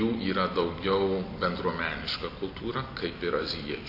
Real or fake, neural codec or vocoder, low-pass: real; none; 5.4 kHz